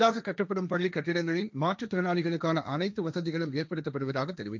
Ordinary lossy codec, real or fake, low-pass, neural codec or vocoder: none; fake; none; codec, 16 kHz, 1.1 kbps, Voila-Tokenizer